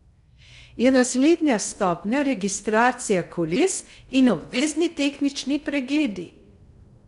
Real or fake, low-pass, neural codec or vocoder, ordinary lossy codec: fake; 10.8 kHz; codec, 16 kHz in and 24 kHz out, 0.6 kbps, FocalCodec, streaming, 2048 codes; none